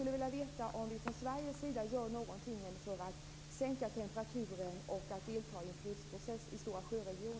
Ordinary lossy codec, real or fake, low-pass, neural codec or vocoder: none; real; none; none